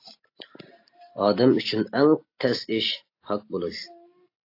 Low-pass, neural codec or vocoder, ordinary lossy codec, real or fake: 5.4 kHz; none; AAC, 32 kbps; real